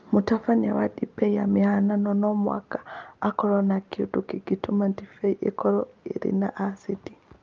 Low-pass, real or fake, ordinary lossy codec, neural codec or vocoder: 7.2 kHz; real; Opus, 24 kbps; none